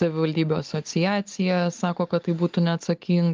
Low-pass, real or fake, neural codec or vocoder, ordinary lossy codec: 7.2 kHz; real; none; Opus, 32 kbps